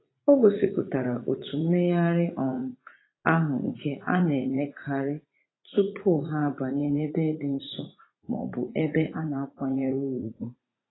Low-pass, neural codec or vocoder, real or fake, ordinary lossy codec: 7.2 kHz; vocoder, 44.1 kHz, 80 mel bands, Vocos; fake; AAC, 16 kbps